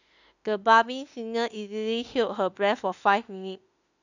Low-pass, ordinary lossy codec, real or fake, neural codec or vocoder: 7.2 kHz; none; fake; autoencoder, 48 kHz, 32 numbers a frame, DAC-VAE, trained on Japanese speech